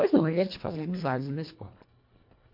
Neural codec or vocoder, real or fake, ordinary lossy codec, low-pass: codec, 24 kHz, 1.5 kbps, HILCodec; fake; MP3, 32 kbps; 5.4 kHz